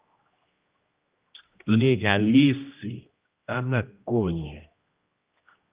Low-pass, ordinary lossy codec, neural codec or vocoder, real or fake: 3.6 kHz; Opus, 32 kbps; codec, 16 kHz, 1 kbps, X-Codec, HuBERT features, trained on general audio; fake